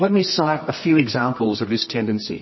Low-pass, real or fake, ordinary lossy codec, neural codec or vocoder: 7.2 kHz; fake; MP3, 24 kbps; codec, 24 kHz, 0.9 kbps, WavTokenizer, medium music audio release